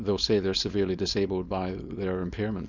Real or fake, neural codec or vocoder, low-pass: fake; codec, 16 kHz, 4.8 kbps, FACodec; 7.2 kHz